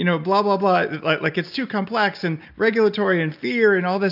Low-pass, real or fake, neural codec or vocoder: 5.4 kHz; real; none